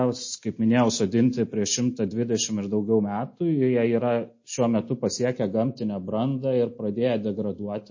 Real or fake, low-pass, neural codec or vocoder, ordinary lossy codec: real; 7.2 kHz; none; MP3, 32 kbps